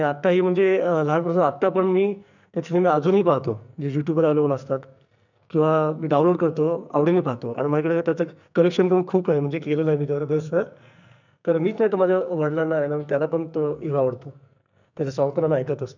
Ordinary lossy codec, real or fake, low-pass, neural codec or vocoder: none; fake; 7.2 kHz; codec, 44.1 kHz, 2.6 kbps, SNAC